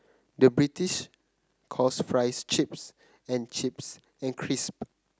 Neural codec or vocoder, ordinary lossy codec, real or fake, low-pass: none; none; real; none